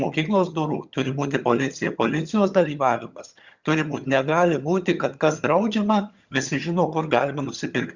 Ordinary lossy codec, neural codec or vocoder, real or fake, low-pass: Opus, 64 kbps; vocoder, 22.05 kHz, 80 mel bands, HiFi-GAN; fake; 7.2 kHz